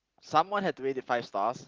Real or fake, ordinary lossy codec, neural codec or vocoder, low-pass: real; Opus, 16 kbps; none; 7.2 kHz